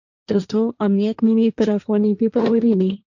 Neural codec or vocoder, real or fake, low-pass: codec, 16 kHz, 1.1 kbps, Voila-Tokenizer; fake; 7.2 kHz